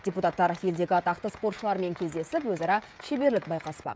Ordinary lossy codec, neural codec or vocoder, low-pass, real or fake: none; codec, 16 kHz, 16 kbps, FreqCodec, smaller model; none; fake